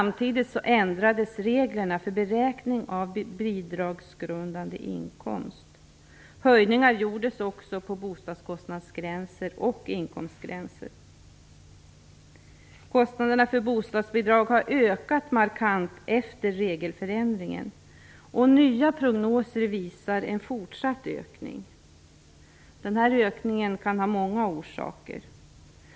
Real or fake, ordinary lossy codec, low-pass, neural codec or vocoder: real; none; none; none